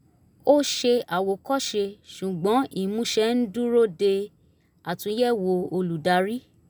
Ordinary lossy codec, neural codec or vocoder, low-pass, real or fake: none; none; none; real